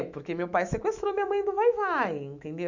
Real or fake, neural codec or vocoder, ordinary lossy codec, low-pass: fake; autoencoder, 48 kHz, 128 numbers a frame, DAC-VAE, trained on Japanese speech; none; 7.2 kHz